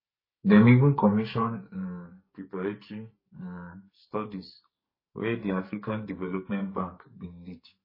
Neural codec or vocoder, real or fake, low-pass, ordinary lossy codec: codec, 44.1 kHz, 2.6 kbps, SNAC; fake; 5.4 kHz; MP3, 24 kbps